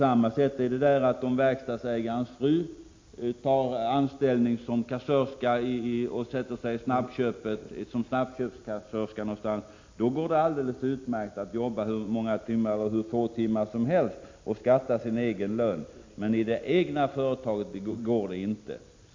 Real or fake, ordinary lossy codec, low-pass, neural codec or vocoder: real; MP3, 48 kbps; 7.2 kHz; none